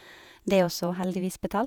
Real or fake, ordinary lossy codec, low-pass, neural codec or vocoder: fake; none; none; vocoder, 48 kHz, 128 mel bands, Vocos